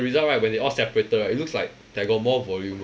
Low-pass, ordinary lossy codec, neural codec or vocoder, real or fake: none; none; none; real